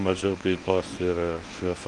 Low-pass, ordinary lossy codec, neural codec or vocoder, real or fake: 10.8 kHz; Opus, 32 kbps; codec, 24 kHz, 0.9 kbps, WavTokenizer, medium speech release version 1; fake